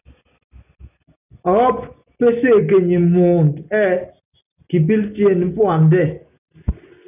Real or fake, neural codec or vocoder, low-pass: real; none; 3.6 kHz